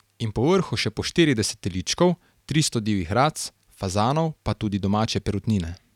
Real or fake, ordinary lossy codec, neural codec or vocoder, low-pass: real; none; none; 19.8 kHz